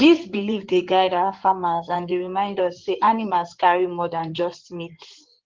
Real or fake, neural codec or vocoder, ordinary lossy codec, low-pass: fake; codec, 16 kHz in and 24 kHz out, 2.2 kbps, FireRedTTS-2 codec; Opus, 16 kbps; 7.2 kHz